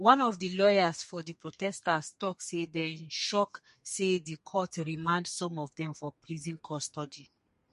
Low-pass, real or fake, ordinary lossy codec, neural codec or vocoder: 14.4 kHz; fake; MP3, 48 kbps; codec, 32 kHz, 1.9 kbps, SNAC